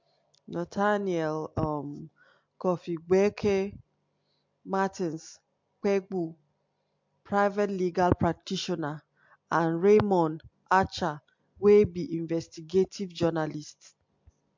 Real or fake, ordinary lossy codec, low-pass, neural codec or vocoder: real; MP3, 48 kbps; 7.2 kHz; none